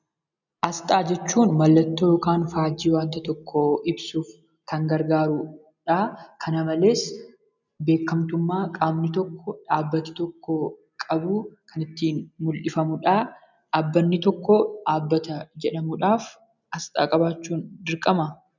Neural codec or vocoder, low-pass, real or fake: none; 7.2 kHz; real